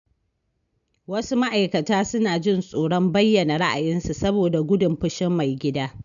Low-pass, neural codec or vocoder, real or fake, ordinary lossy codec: 7.2 kHz; none; real; none